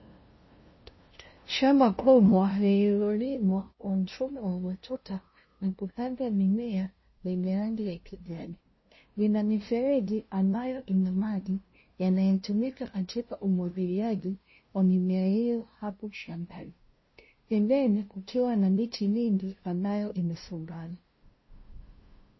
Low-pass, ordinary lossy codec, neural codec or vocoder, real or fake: 7.2 kHz; MP3, 24 kbps; codec, 16 kHz, 0.5 kbps, FunCodec, trained on LibriTTS, 25 frames a second; fake